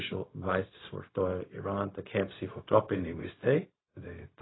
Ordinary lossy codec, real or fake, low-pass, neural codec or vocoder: AAC, 16 kbps; fake; 7.2 kHz; codec, 16 kHz, 0.4 kbps, LongCat-Audio-Codec